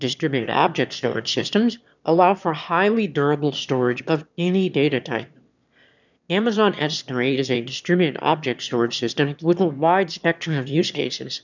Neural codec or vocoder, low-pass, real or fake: autoencoder, 22.05 kHz, a latent of 192 numbers a frame, VITS, trained on one speaker; 7.2 kHz; fake